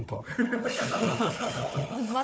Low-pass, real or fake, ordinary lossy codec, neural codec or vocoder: none; fake; none; codec, 16 kHz, 4 kbps, FunCodec, trained on Chinese and English, 50 frames a second